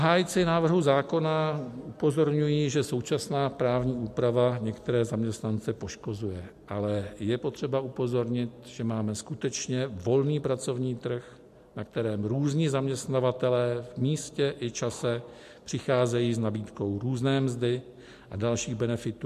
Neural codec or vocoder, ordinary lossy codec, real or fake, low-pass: none; MP3, 64 kbps; real; 14.4 kHz